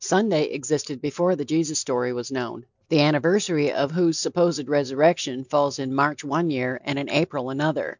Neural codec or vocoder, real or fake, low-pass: none; real; 7.2 kHz